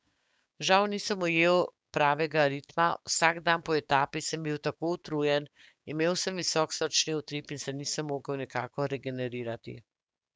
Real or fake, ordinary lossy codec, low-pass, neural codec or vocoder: fake; none; none; codec, 16 kHz, 6 kbps, DAC